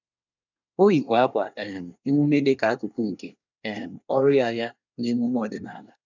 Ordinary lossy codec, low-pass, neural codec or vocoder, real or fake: none; 7.2 kHz; codec, 24 kHz, 1 kbps, SNAC; fake